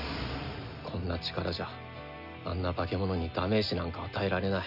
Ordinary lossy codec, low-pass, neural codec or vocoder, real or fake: none; 5.4 kHz; none; real